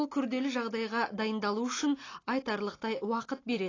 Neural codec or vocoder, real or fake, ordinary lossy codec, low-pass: none; real; AAC, 32 kbps; 7.2 kHz